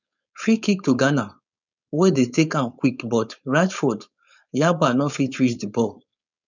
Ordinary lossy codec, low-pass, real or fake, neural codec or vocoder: none; 7.2 kHz; fake; codec, 16 kHz, 4.8 kbps, FACodec